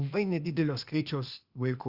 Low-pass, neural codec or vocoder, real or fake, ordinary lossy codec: 5.4 kHz; codec, 16 kHz, 0.8 kbps, ZipCodec; fake; Opus, 64 kbps